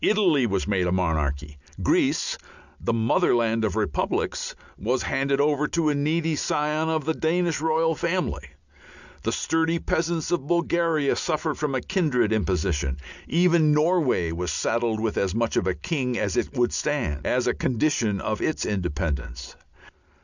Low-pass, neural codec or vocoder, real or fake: 7.2 kHz; none; real